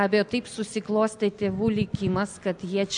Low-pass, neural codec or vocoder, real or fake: 9.9 kHz; none; real